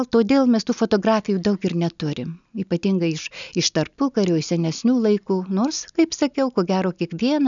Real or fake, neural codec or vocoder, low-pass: real; none; 7.2 kHz